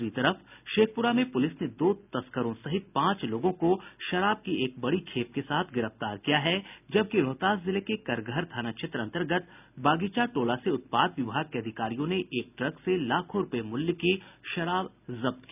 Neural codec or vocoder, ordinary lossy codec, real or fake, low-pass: none; none; real; 3.6 kHz